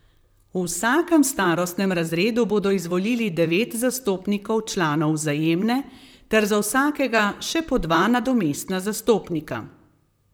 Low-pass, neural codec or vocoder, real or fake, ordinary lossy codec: none; vocoder, 44.1 kHz, 128 mel bands, Pupu-Vocoder; fake; none